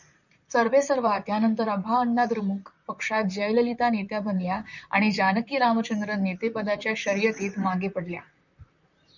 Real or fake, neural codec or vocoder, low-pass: fake; vocoder, 44.1 kHz, 128 mel bands, Pupu-Vocoder; 7.2 kHz